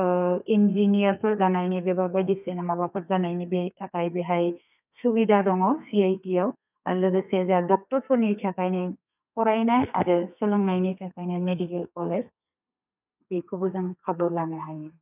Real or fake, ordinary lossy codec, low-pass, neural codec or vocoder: fake; none; 3.6 kHz; codec, 32 kHz, 1.9 kbps, SNAC